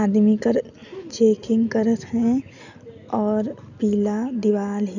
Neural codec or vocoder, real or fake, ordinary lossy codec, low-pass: none; real; none; 7.2 kHz